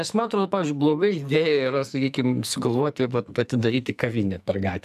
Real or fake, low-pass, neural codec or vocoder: fake; 14.4 kHz; codec, 44.1 kHz, 2.6 kbps, SNAC